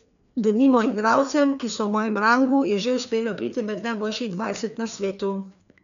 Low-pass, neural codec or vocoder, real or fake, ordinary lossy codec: 7.2 kHz; codec, 16 kHz, 2 kbps, FreqCodec, larger model; fake; none